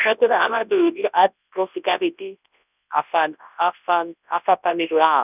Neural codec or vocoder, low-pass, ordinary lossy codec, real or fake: codec, 24 kHz, 0.9 kbps, WavTokenizer, large speech release; 3.6 kHz; none; fake